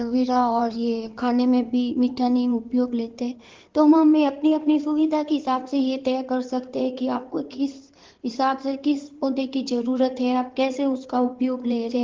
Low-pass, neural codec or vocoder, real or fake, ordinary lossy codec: 7.2 kHz; codec, 16 kHz in and 24 kHz out, 2.2 kbps, FireRedTTS-2 codec; fake; Opus, 16 kbps